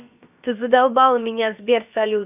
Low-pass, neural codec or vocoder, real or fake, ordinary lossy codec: 3.6 kHz; codec, 16 kHz, about 1 kbps, DyCAST, with the encoder's durations; fake; none